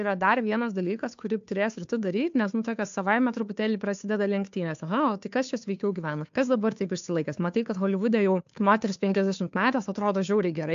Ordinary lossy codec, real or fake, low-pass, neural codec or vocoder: AAC, 64 kbps; fake; 7.2 kHz; codec, 16 kHz, 2 kbps, FunCodec, trained on Chinese and English, 25 frames a second